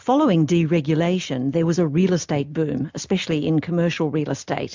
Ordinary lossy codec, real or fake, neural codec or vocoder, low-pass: MP3, 64 kbps; real; none; 7.2 kHz